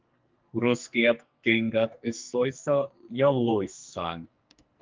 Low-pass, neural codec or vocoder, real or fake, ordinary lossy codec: 7.2 kHz; codec, 44.1 kHz, 2.6 kbps, SNAC; fake; Opus, 32 kbps